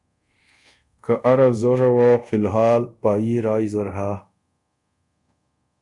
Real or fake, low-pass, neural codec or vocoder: fake; 10.8 kHz; codec, 24 kHz, 0.5 kbps, DualCodec